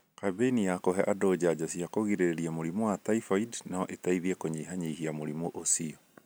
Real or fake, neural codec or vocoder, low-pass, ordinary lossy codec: real; none; none; none